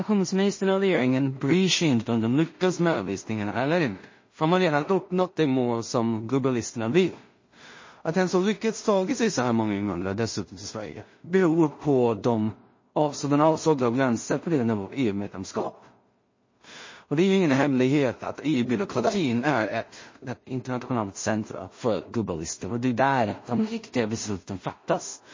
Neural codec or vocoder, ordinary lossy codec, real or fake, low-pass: codec, 16 kHz in and 24 kHz out, 0.4 kbps, LongCat-Audio-Codec, two codebook decoder; MP3, 32 kbps; fake; 7.2 kHz